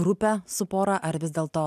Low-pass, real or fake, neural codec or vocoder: 14.4 kHz; real; none